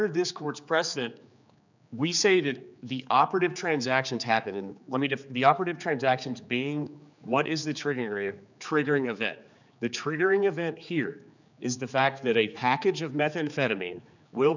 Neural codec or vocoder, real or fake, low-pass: codec, 16 kHz, 4 kbps, X-Codec, HuBERT features, trained on general audio; fake; 7.2 kHz